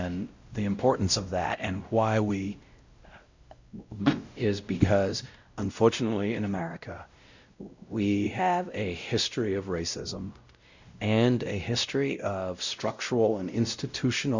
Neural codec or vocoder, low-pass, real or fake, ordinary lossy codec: codec, 16 kHz, 0.5 kbps, X-Codec, WavLM features, trained on Multilingual LibriSpeech; 7.2 kHz; fake; Opus, 64 kbps